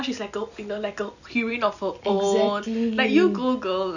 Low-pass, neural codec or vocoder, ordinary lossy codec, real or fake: 7.2 kHz; none; none; real